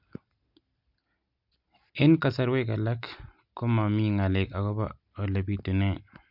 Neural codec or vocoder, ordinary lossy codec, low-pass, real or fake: none; MP3, 48 kbps; 5.4 kHz; real